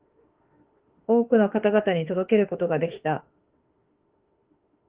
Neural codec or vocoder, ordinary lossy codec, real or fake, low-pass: autoencoder, 48 kHz, 32 numbers a frame, DAC-VAE, trained on Japanese speech; Opus, 16 kbps; fake; 3.6 kHz